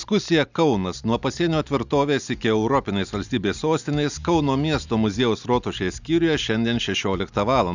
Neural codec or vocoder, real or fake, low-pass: none; real; 7.2 kHz